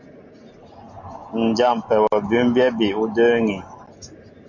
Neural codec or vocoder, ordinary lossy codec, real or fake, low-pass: none; MP3, 48 kbps; real; 7.2 kHz